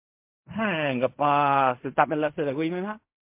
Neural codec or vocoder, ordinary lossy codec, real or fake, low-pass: codec, 16 kHz in and 24 kHz out, 0.4 kbps, LongCat-Audio-Codec, fine tuned four codebook decoder; MP3, 32 kbps; fake; 3.6 kHz